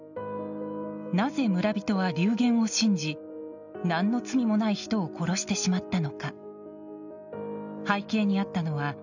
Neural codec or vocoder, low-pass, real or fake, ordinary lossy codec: none; 7.2 kHz; real; none